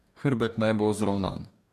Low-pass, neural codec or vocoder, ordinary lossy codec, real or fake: 14.4 kHz; codec, 44.1 kHz, 2.6 kbps, DAC; MP3, 96 kbps; fake